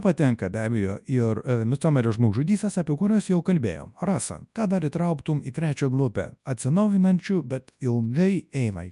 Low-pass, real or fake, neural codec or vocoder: 10.8 kHz; fake; codec, 24 kHz, 0.9 kbps, WavTokenizer, large speech release